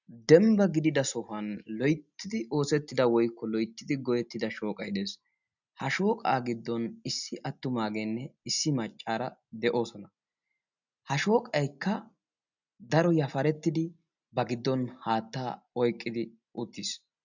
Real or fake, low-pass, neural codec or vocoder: real; 7.2 kHz; none